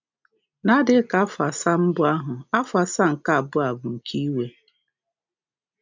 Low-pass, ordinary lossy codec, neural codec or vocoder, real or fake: 7.2 kHz; MP3, 64 kbps; none; real